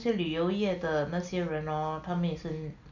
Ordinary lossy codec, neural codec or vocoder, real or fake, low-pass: none; none; real; 7.2 kHz